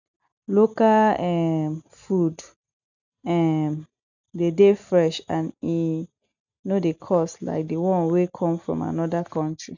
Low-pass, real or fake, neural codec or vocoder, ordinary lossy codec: 7.2 kHz; real; none; none